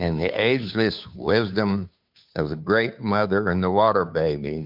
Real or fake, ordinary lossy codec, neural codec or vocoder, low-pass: fake; MP3, 48 kbps; codec, 16 kHz, 2 kbps, FunCodec, trained on Chinese and English, 25 frames a second; 5.4 kHz